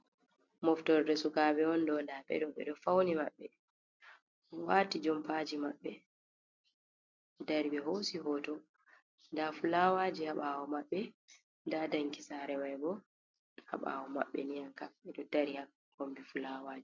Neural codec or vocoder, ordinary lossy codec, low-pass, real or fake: none; MP3, 64 kbps; 7.2 kHz; real